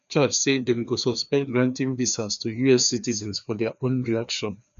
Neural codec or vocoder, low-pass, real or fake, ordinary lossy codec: codec, 16 kHz, 2 kbps, FreqCodec, larger model; 7.2 kHz; fake; none